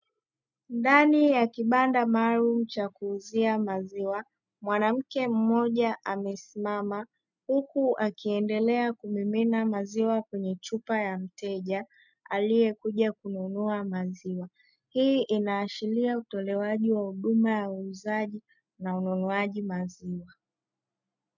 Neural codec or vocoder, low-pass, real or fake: none; 7.2 kHz; real